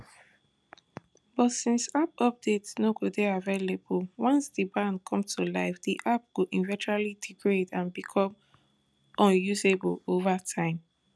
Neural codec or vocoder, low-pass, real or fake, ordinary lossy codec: none; none; real; none